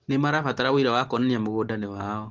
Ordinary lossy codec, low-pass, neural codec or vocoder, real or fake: Opus, 16 kbps; 7.2 kHz; none; real